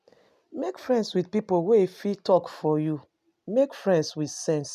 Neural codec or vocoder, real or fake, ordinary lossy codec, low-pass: none; real; none; 14.4 kHz